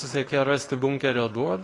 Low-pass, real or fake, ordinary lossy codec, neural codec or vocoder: 10.8 kHz; fake; AAC, 32 kbps; codec, 24 kHz, 0.9 kbps, WavTokenizer, small release